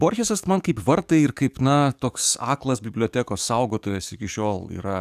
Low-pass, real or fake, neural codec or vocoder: 14.4 kHz; fake; codec, 44.1 kHz, 7.8 kbps, DAC